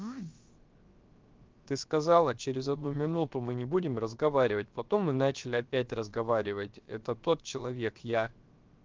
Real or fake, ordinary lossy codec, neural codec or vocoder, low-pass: fake; Opus, 24 kbps; codec, 16 kHz, 0.7 kbps, FocalCodec; 7.2 kHz